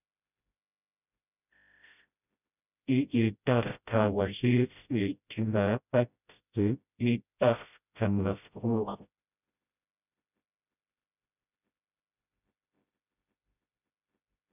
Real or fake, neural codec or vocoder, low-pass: fake; codec, 16 kHz, 0.5 kbps, FreqCodec, smaller model; 3.6 kHz